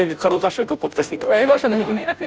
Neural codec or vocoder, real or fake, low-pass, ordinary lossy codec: codec, 16 kHz, 0.5 kbps, FunCodec, trained on Chinese and English, 25 frames a second; fake; none; none